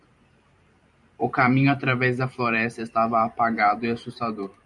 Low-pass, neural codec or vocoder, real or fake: 10.8 kHz; vocoder, 44.1 kHz, 128 mel bands every 512 samples, BigVGAN v2; fake